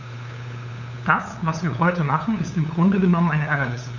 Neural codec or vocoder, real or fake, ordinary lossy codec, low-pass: codec, 16 kHz, 8 kbps, FunCodec, trained on LibriTTS, 25 frames a second; fake; none; 7.2 kHz